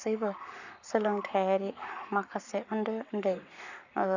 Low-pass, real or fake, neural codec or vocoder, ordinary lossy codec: 7.2 kHz; fake; codec, 44.1 kHz, 7.8 kbps, Pupu-Codec; none